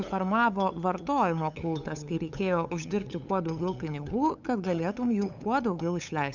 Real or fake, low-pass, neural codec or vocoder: fake; 7.2 kHz; codec, 16 kHz, 8 kbps, FunCodec, trained on LibriTTS, 25 frames a second